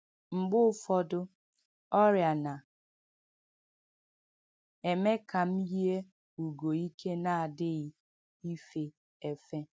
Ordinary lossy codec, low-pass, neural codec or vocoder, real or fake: none; none; none; real